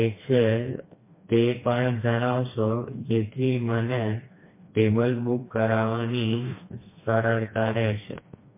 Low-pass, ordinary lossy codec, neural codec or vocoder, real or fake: 3.6 kHz; MP3, 24 kbps; codec, 16 kHz, 2 kbps, FreqCodec, smaller model; fake